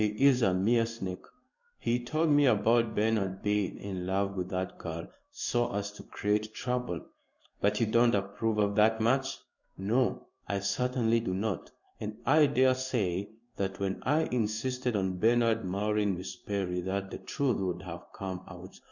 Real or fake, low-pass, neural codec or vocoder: fake; 7.2 kHz; codec, 16 kHz in and 24 kHz out, 1 kbps, XY-Tokenizer